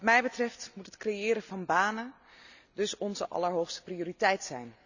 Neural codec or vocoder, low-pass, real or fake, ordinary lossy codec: none; 7.2 kHz; real; none